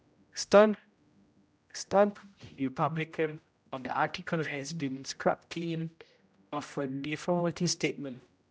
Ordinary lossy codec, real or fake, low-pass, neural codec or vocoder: none; fake; none; codec, 16 kHz, 0.5 kbps, X-Codec, HuBERT features, trained on general audio